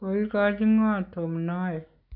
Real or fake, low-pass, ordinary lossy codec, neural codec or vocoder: real; 5.4 kHz; none; none